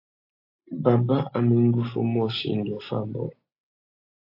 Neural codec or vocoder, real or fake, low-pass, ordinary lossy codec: none; real; 5.4 kHz; AAC, 32 kbps